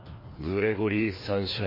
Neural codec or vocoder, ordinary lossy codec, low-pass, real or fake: codec, 16 kHz, 2 kbps, FreqCodec, larger model; MP3, 24 kbps; 5.4 kHz; fake